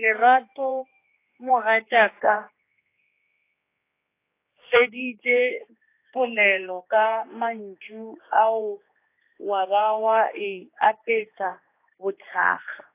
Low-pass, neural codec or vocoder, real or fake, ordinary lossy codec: 3.6 kHz; codec, 16 kHz, 2 kbps, X-Codec, HuBERT features, trained on general audio; fake; AAC, 24 kbps